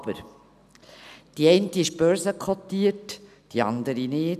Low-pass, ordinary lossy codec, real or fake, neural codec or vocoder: 14.4 kHz; none; real; none